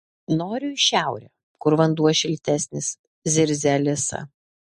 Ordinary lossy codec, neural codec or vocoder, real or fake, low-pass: MP3, 48 kbps; none; real; 14.4 kHz